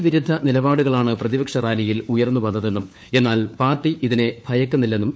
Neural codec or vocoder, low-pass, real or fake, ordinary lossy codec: codec, 16 kHz, 4 kbps, FunCodec, trained on LibriTTS, 50 frames a second; none; fake; none